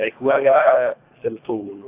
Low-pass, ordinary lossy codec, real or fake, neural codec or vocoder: 3.6 kHz; AAC, 24 kbps; fake; codec, 24 kHz, 1.5 kbps, HILCodec